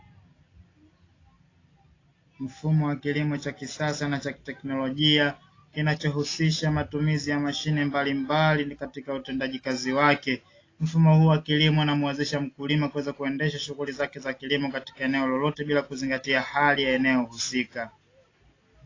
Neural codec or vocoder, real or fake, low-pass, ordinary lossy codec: none; real; 7.2 kHz; AAC, 32 kbps